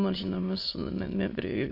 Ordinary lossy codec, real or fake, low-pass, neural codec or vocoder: MP3, 32 kbps; fake; 5.4 kHz; autoencoder, 22.05 kHz, a latent of 192 numbers a frame, VITS, trained on many speakers